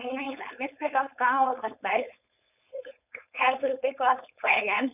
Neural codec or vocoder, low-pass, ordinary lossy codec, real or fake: codec, 16 kHz, 4.8 kbps, FACodec; 3.6 kHz; none; fake